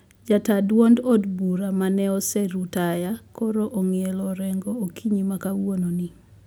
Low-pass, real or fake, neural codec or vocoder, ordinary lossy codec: none; real; none; none